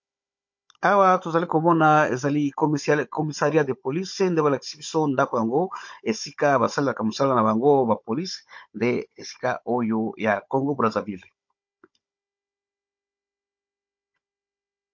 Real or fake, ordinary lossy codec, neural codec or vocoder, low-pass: fake; MP3, 48 kbps; codec, 16 kHz, 16 kbps, FunCodec, trained on Chinese and English, 50 frames a second; 7.2 kHz